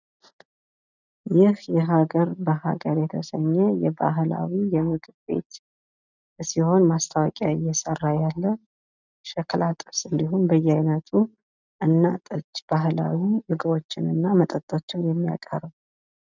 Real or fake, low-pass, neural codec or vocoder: real; 7.2 kHz; none